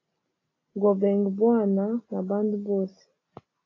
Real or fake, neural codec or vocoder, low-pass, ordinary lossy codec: real; none; 7.2 kHz; AAC, 32 kbps